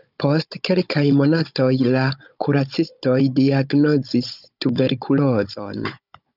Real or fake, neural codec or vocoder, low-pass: fake; codec, 16 kHz, 16 kbps, FunCodec, trained on Chinese and English, 50 frames a second; 5.4 kHz